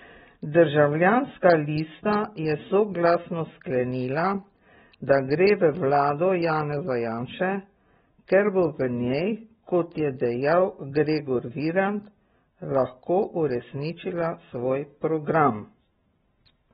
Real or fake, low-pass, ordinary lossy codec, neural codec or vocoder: real; 19.8 kHz; AAC, 16 kbps; none